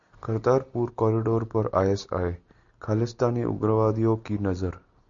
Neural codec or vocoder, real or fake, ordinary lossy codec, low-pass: none; real; MP3, 64 kbps; 7.2 kHz